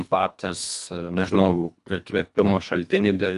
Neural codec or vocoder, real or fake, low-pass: codec, 24 kHz, 1.5 kbps, HILCodec; fake; 10.8 kHz